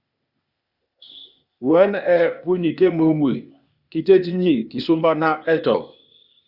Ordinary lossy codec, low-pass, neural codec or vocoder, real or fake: Opus, 64 kbps; 5.4 kHz; codec, 16 kHz, 0.8 kbps, ZipCodec; fake